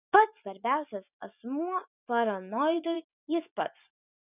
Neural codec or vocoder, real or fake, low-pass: vocoder, 24 kHz, 100 mel bands, Vocos; fake; 3.6 kHz